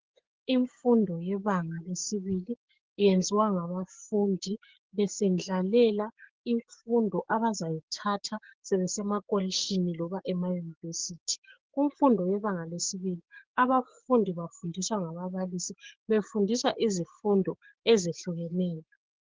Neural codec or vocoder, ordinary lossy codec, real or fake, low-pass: codec, 16 kHz, 6 kbps, DAC; Opus, 16 kbps; fake; 7.2 kHz